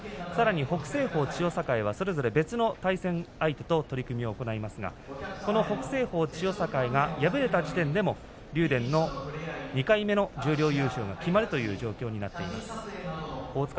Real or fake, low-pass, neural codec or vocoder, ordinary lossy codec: real; none; none; none